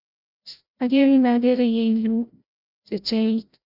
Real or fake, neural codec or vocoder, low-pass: fake; codec, 16 kHz, 0.5 kbps, FreqCodec, larger model; 5.4 kHz